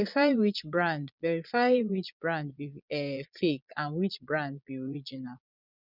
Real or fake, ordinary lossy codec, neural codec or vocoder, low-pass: fake; none; vocoder, 22.05 kHz, 80 mel bands, Vocos; 5.4 kHz